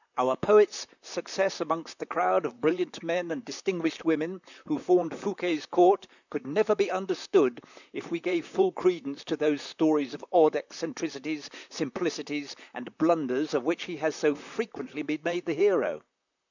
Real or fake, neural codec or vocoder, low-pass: fake; vocoder, 44.1 kHz, 128 mel bands, Pupu-Vocoder; 7.2 kHz